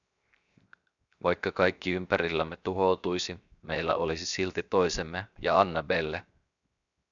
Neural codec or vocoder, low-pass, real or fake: codec, 16 kHz, 0.7 kbps, FocalCodec; 7.2 kHz; fake